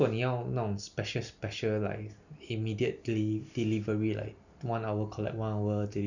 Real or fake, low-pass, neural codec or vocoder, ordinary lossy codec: real; 7.2 kHz; none; none